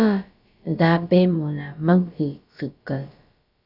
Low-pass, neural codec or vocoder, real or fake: 5.4 kHz; codec, 16 kHz, about 1 kbps, DyCAST, with the encoder's durations; fake